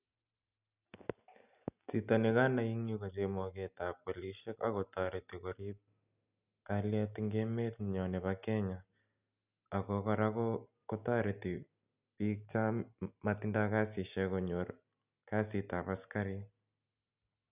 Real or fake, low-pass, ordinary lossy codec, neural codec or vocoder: real; 3.6 kHz; none; none